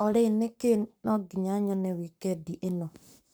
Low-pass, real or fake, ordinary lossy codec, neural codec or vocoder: none; fake; none; codec, 44.1 kHz, 7.8 kbps, Pupu-Codec